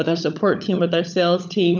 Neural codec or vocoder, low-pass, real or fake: codec, 16 kHz, 16 kbps, FunCodec, trained on LibriTTS, 50 frames a second; 7.2 kHz; fake